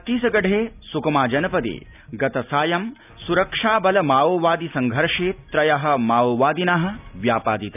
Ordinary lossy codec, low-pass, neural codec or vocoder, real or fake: none; 3.6 kHz; none; real